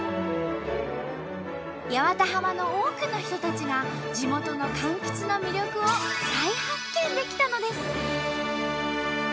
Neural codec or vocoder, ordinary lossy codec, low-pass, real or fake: none; none; none; real